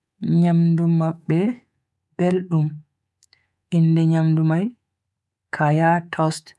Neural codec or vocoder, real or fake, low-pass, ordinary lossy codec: codec, 24 kHz, 3.1 kbps, DualCodec; fake; none; none